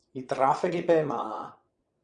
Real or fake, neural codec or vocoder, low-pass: fake; vocoder, 22.05 kHz, 80 mel bands, WaveNeXt; 9.9 kHz